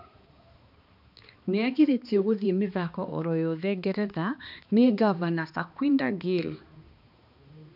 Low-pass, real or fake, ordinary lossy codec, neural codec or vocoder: 5.4 kHz; fake; none; codec, 16 kHz, 2 kbps, X-Codec, HuBERT features, trained on balanced general audio